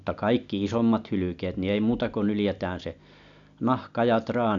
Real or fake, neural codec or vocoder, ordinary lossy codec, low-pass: real; none; AAC, 64 kbps; 7.2 kHz